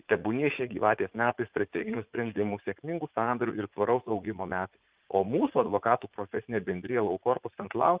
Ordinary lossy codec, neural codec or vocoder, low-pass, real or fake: Opus, 32 kbps; vocoder, 44.1 kHz, 80 mel bands, Vocos; 3.6 kHz; fake